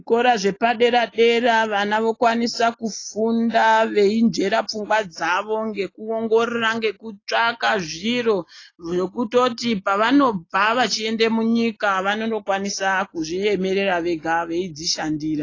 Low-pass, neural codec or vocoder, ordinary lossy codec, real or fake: 7.2 kHz; none; AAC, 32 kbps; real